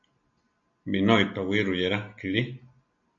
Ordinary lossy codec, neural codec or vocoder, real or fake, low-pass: AAC, 64 kbps; none; real; 7.2 kHz